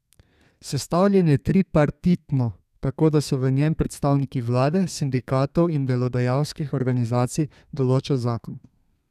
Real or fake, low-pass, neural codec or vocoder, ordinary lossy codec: fake; 14.4 kHz; codec, 32 kHz, 1.9 kbps, SNAC; none